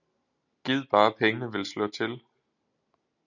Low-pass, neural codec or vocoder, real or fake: 7.2 kHz; none; real